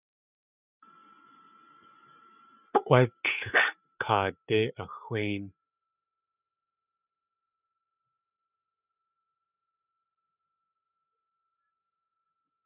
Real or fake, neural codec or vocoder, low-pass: fake; codec, 16 kHz, 8 kbps, FreqCodec, larger model; 3.6 kHz